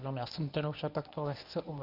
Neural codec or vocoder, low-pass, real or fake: codec, 24 kHz, 0.9 kbps, WavTokenizer, medium speech release version 1; 5.4 kHz; fake